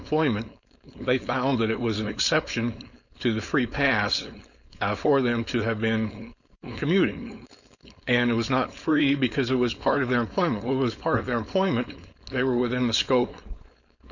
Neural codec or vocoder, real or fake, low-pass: codec, 16 kHz, 4.8 kbps, FACodec; fake; 7.2 kHz